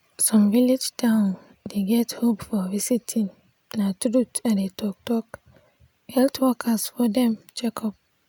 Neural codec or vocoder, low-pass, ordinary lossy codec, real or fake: none; none; none; real